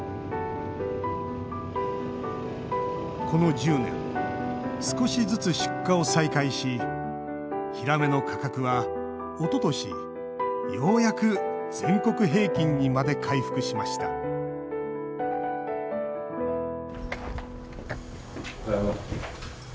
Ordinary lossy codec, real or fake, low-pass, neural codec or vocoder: none; real; none; none